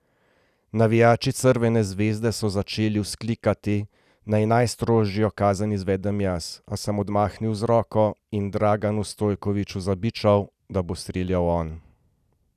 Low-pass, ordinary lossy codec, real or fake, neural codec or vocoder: 14.4 kHz; none; real; none